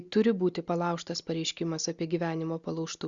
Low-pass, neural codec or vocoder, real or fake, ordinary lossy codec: 7.2 kHz; none; real; Opus, 64 kbps